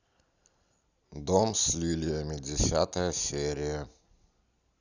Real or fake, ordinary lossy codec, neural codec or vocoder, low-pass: real; Opus, 64 kbps; none; 7.2 kHz